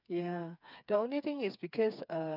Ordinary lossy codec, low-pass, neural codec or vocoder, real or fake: none; 5.4 kHz; codec, 16 kHz, 4 kbps, FreqCodec, smaller model; fake